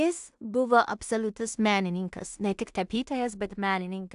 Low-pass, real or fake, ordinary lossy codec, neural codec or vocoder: 10.8 kHz; fake; AAC, 96 kbps; codec, 16 kHz in and 24 kHz out, 0.4 kbps, LongCat-Audio-Codec, two codebook decoder